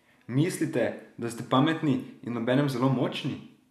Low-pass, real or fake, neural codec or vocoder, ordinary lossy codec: 14.4 kHz; real; none; none